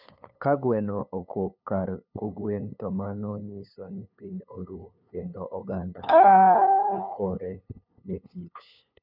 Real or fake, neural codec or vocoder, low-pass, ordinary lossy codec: fake; codec, 16 kHz, 2 kbps, FunCodec, trained on LibriTTS, 25 frames a second; 5.4 kHz; none